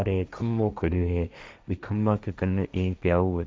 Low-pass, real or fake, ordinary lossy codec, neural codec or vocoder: none; fake; none; codec, 16 kHz, 1.1 kbps, Voila-Tokenizer